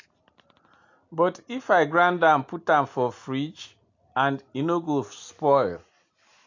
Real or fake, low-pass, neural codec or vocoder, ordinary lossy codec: real; 7.2 kHz; none; AAC, 48 kbps